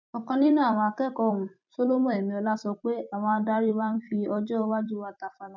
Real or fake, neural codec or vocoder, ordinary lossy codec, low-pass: fake; vocoder, 44.1 kHz, 128 mel bands every 256 samples, BigVGAN v2; none; 7.2 kHz